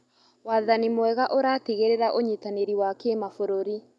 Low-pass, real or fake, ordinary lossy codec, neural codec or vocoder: none; real; none; none